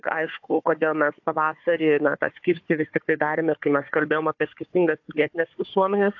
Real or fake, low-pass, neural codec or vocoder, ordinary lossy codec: fake; 7.2 kHz; codec, 16 kHz, 4 kbps, FunCodec, trained on Chinese and English, 50 frames a second; AAC, 48 kbps